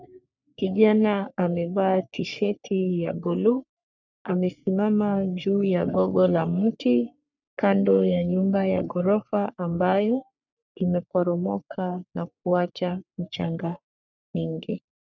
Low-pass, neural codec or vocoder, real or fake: 7.2 kHz; codec, 44.1 kHz, 3.4 kbps, Pupu-Codec; fake